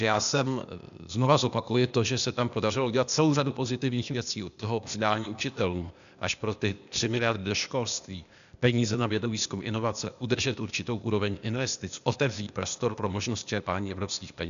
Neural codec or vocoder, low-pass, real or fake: codec, 16 kHz, 0.8 kbps, ZipCodec; 7.2 kHz; fake